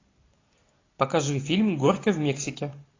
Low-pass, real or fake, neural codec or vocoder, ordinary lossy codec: 7.2 kHz; real; none; AAC, 32 kbps